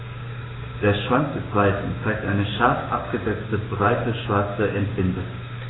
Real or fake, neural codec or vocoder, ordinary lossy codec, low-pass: fake; codec, 16 kHz, 6 kbps, DAC; AAC, 16 kbps; 7.2 kHz